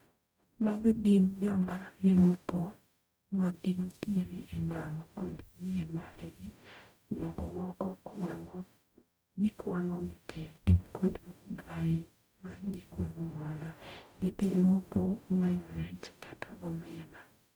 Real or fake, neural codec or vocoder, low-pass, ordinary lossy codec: fake; codec, 44.1 kHz, 0.9 kbps, DAC; none; none